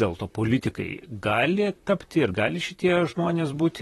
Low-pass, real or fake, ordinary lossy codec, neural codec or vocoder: 19.8 kHz; fake; AAC, 32 kbps; vocoder, 44.1 kHz, 128 mel bands, Pupu-Vocoder